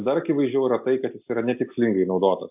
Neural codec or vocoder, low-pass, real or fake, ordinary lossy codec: none; 3.6 kHz; real; AAC, 32 kbps